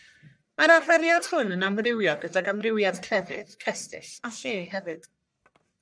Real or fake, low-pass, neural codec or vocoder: fake; 9.9 kHz; codec, 44.1 kHz, 1.7 kbps, Pupu-Codec